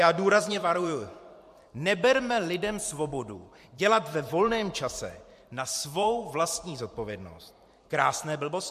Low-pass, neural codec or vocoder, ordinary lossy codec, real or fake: 14.4 kHz; vocoder, 44.1 kHz, 128 mel bands every 512 samples, BigVGAN v2; MP3, 64 kbps; fake